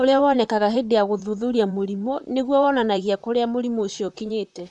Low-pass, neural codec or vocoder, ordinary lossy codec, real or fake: 10.8 kHz; vocoder, 44.1 kHz, 128 mel bands, Pupu-Vocoder; none; fake